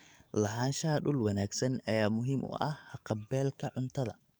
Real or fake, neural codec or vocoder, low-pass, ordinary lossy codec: fake; codec, 44.1 kHz, 7.8 kbps, DAC; none; none